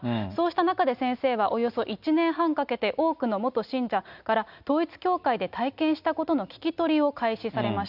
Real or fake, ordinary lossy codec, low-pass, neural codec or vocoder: real; none; 5.4 kHz; none